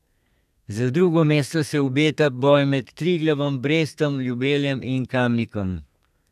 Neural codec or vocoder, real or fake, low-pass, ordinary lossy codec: codec, 32 kHz, 1.9 kbps, SNAC; fake; 14.4 kHz; none